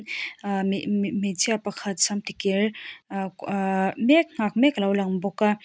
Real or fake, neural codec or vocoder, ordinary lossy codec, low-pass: real; none; none; none